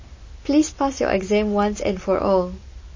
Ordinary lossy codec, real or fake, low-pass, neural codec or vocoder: MP3, 32 kbps; real; 7.2 kHz; none